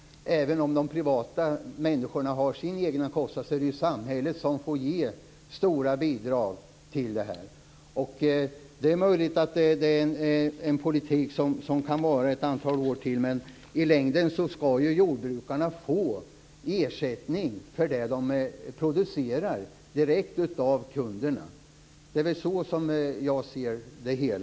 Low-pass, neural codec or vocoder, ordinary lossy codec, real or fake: none; none; none; real